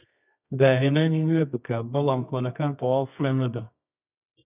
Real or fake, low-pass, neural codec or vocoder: fake; 3.6 kHz; codec, 24 kHz, 0.9 kbps, WavTokenizer, medium music audio release